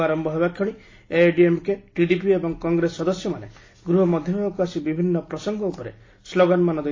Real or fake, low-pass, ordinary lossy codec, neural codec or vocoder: real; 7.2 kHz; AAC, 32 kbps; none